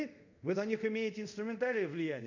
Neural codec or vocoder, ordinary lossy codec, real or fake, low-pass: codec, 24 kHz, 0.5 kbps, DualCodec; none; fake; 7.2 kHz